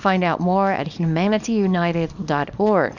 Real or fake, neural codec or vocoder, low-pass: fake; codec, 24 kHz, 0.9 kbps, WavTokenizer, small release; 7.2 kHz